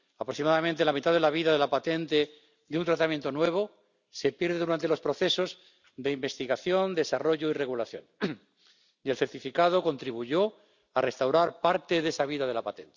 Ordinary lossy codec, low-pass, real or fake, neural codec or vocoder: none; 7.2 kHz; real; none